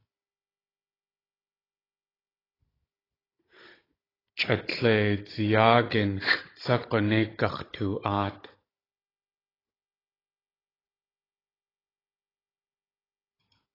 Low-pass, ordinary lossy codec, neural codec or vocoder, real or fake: 5.4 kHz; AAC, 24 kbps; codec, 16 kHz, 16 kbps, FunCodec, trained on Chinese and English, 50 frames a second; fake